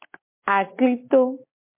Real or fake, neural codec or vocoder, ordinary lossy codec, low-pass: real; none; MP3, 24 kbps; 3.6 kHz